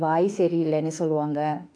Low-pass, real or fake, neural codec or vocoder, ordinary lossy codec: 9.9 kHz; fake; autoencoder, 48 kHz, 32 numbers a frame, DAC-VAE, trained on Japanese speech; none